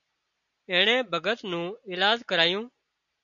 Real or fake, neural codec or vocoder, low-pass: real; none; 7.2 kHz